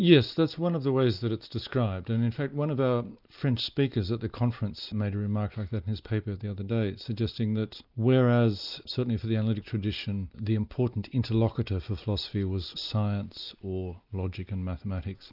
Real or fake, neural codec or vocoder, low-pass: real; none; 5.4 kHz